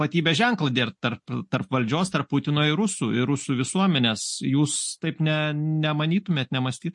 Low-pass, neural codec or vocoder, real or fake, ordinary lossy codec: 10.8 kHz; none; real; MP3, 48 kbps